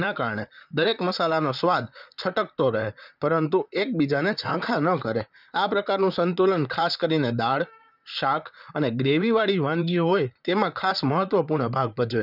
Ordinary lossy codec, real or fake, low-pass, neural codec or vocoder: none; fake; 5.4 kHz; vocoder, 44.1 kHz, 128 mel bands, Pupu-Vocoder